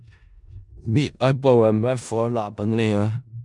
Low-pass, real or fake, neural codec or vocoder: 10.8 kHz; fake; codec, 16 kHz in and 24 kHz out, 0.4 kbps, LongCat-Audio-Codec, four codebook decoder